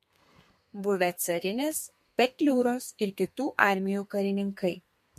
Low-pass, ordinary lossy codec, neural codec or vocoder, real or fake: 14.4 kHz; MP3, 64 kbps; codec, 32 kHz, 1.9 kbps, SNAC; fake